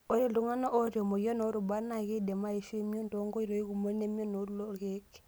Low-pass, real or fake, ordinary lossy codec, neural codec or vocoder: none; real; none; none